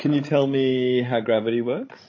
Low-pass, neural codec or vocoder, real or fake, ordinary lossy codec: 7.2 kHz; codec, 16 kHz, 16 kbps, FreqCodec, larger model; fake; MP3, 32 kbps